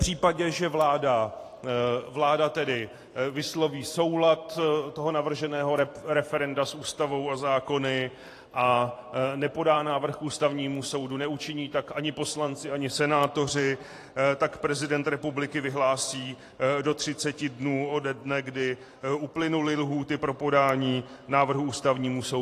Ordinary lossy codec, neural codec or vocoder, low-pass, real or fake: AAC, 48 kbps; vocoder, 44.1 kHz, 128 mel bands every 512 samples, BigVGAN v2; 14.4 kHz; fake